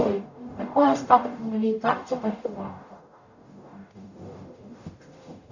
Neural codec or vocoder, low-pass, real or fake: codec, 44.1 kHz, 0.9 kbps, DAC; 7.2 kHz; fake